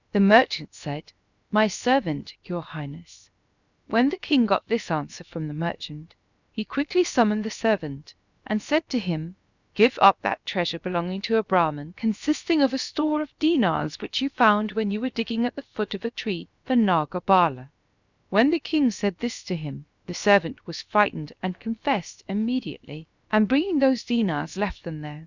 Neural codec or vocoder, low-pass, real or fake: codec, 16 kHz, about 1 kbps, DyCAST, with the encoder's durations; 7.2 kHz; fake